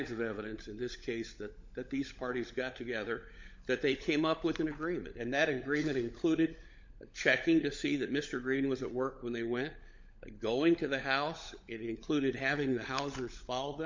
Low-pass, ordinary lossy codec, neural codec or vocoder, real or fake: 7.2 kHz; MP3, 48 kbps; codec, 16 kHz, 16 kbps, FunCodec, trained on LibriTTS, 50 frames a second; fake